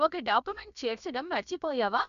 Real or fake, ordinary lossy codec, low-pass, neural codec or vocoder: fake; none; 7.2 kHz; codec, 16 kHz, about 1 kbps, DyCAST, with the encoder's durations